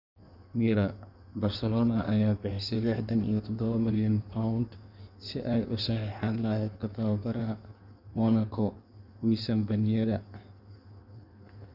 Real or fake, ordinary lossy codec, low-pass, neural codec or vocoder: fake; none; 5.4 kHz; codec, 16 kHz in and 24 kHz out, 1.1 kbps, FireRedTTS-2 codec